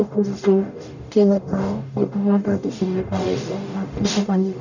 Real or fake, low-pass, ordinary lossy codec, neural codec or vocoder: fake; 7.2 kHz; none; codec, 44.1 kHz, 0.9 kbps, DAC